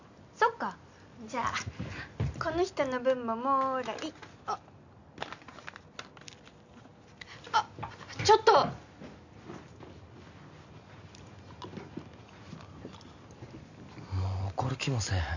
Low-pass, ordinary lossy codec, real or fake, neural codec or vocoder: 7.2 kHz; none; real; none